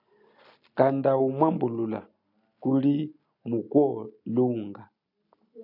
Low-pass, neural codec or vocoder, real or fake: 5.4 kHz; none; real